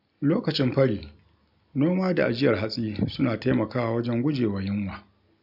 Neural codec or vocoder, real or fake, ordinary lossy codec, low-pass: none; real; none; 5.4 kHz